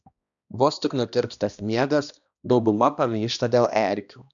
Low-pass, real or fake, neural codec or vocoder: 7.2 kHz; fake; codec, 16 kHz, 1 kbps, X-Codec, HuBERT features, trained on balanced general audio